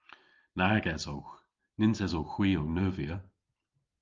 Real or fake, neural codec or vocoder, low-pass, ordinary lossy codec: real; none; 7.2 kHz; Opus, 32 kbps